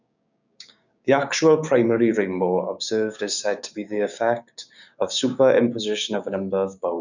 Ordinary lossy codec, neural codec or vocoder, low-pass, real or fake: none; codec, 16 kHz, 6 kbps, DAC; 7.2 kHz; fake